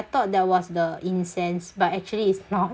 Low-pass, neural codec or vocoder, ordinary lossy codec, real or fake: none; none; none; real